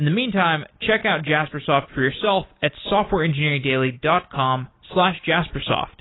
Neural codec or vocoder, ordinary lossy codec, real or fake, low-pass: none; AAC, 16 kbps; real; 7.2 kHz